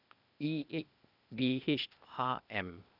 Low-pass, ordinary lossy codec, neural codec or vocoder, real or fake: 5.4 kHz; none; codec, 16 kHz, 0.8 kbps, ZipCodec; fake